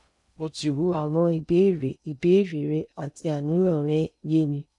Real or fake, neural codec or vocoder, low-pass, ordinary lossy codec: fake; codec, 16 kHz in and 24 kHz out, 0.6 kbps, FocalCodec, streaming, 2048 codes; 10.8 kHz; none